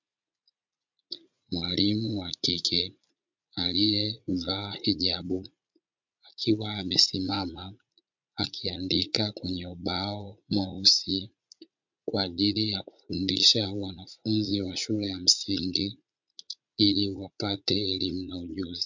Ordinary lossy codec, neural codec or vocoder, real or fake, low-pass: MP3, 64 kbps; vocoder, 44.1 kHz, 80 mel bands, Vocos; fake; 7.2 kHz